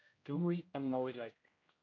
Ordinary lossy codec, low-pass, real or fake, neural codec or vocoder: AAC, 32 kbps; 7.2 kHz; fake; codec, 16 kHz, 0.5 kbps, X-Codec, HuBERT features, trained on general audio